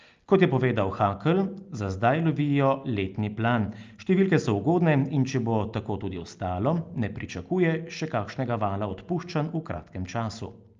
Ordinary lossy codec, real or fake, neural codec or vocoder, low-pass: Opus, 24 kbps; real; none; 7.2 kHz